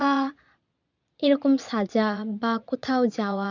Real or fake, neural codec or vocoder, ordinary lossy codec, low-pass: fake; vocoder, 22.05 kHz, 80 mel bands, Vocos; none; 7.2 kHz